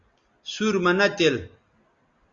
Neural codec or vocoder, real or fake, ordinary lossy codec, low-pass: none; real; Opus, 64 kbps; 7.2 kHz